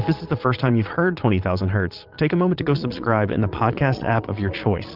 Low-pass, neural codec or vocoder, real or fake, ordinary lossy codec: 5.4 kHz; vocoder, 22.05 kHz, 80 mel bands, Vocos; fake; Opus, 24 kbps